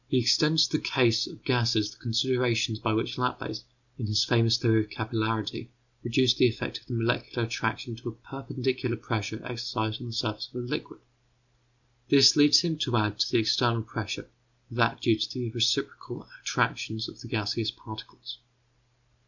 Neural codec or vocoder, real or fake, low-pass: none; real; 7.2 kHz